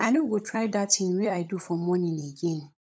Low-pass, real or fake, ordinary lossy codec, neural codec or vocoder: none; fake; none; codec, 16 kHz, 16 kbps, FunCodec, trained on LibriTTS, 50 frames a second